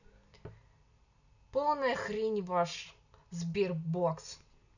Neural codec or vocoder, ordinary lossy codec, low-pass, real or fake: none; none; 7.2 kHz; real